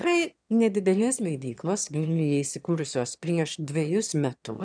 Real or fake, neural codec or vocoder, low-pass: fake; autoencoder, 22.05 kHz, a latent of 192 numbers a frame, VITS, trained on one speaker; 9.9 kHz